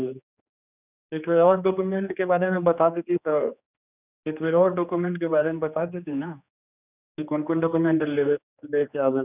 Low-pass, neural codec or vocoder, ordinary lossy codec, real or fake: 3.6 kHz; codec, 16 kHz, 2 kbps, X-Codec, HuBERT features, trained on general audio; none; fake